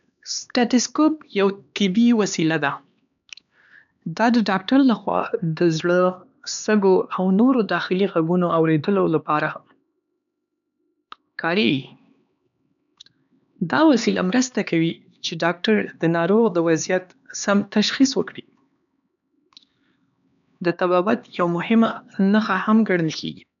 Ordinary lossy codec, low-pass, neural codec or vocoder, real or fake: none; 7.2 kHz; codec, 16 kHz, 2 kbps, X-Codec, HuBERT features, trained on LibriSpeech; fake